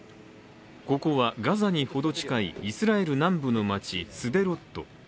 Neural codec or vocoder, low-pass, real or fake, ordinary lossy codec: none; none; real; none